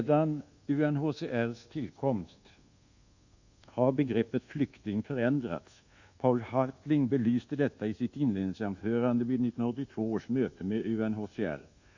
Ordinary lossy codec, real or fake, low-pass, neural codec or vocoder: Opus, 64 kbps; fake; 7.2 kHz; codec, 24 kHz, 1.2 kbps, DualCodec